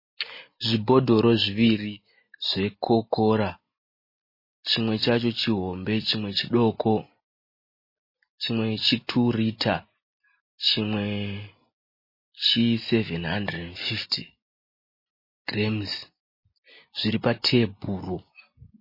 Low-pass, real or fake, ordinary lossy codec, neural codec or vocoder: 5.4 kHz; real; MP3, 24 kbps; none